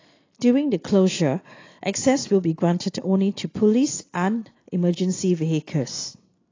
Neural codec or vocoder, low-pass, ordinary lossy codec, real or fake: none; 7.2 kHz; AAC, 32 kbps; real